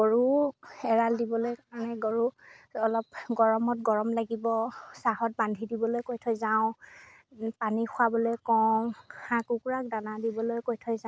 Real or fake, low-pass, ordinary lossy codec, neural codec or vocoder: real; none; none; none